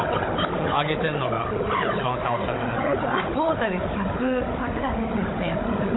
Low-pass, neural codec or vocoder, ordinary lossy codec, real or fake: 7.2 kHz; codec, 16 kHz, 16 kbps, FunCodec, trained on Chinese and English, 50 frames a second; AAC, 16 kbps; fake